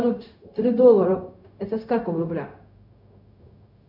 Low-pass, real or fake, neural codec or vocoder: 5.4 kHz; fake; codec, 16 kHz, 0.4 kbps, LongCat-Audio-Codec